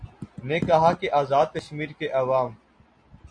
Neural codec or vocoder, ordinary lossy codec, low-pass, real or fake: none; MP3, 64 kbps; 9.9 kHz; real